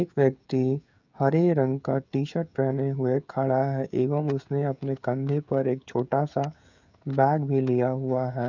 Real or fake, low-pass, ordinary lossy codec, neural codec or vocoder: fake; 7.2 kHz; none; codec, 16 kHz, 16 kbps, FreqCodec, smaller model